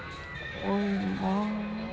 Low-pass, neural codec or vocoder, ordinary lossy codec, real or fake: none; none; none; real